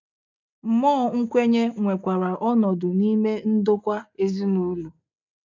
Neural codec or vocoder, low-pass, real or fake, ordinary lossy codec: none; 7.2 kHz; real; none